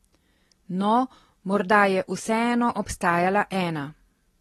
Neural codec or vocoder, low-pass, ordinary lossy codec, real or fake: none; 19.8 kHz; AAC, 32 kbps; real